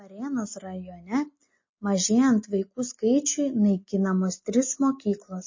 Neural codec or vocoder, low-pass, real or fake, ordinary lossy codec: none; 7.2 kHz; real; MP3, 32 kbps